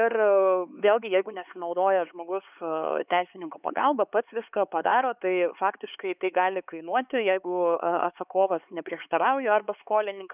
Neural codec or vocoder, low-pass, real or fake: codec, 16 kHz, 4 kbps, X-Codec, HuBERT features, trained on LibriSpeech; 3.6 kHz; fake